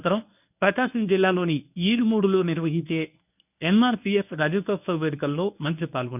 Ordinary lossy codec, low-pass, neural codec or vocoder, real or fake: none; 3.6 kHz; codec, 24 kHz, 0.9 kbps, WavTokenizer, medium speech release version 1; fake